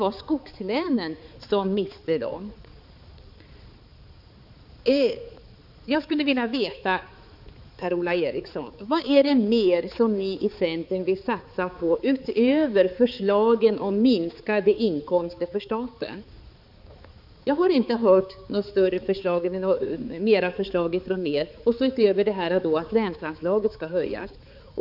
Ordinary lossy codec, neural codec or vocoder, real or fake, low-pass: none; codec, 16 kHz, 4 kbps, X-Codec, HuBERT features, trained on balanced general audio; fake; 5.4 kHz